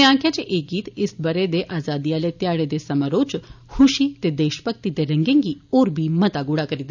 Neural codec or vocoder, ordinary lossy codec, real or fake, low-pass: none; none; real; 7.2 kHz